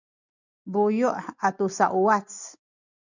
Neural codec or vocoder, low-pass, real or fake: none; 7.2 kHz; real